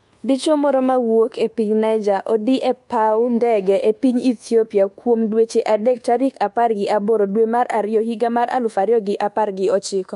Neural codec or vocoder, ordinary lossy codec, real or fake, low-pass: codec, 24 kHz, 1.2 kbps, DualCodec; MP3, 64 kbps; fake; 10.8 kHz